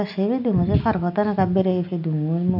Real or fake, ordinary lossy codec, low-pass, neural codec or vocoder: real; none; 5.4 kHz; none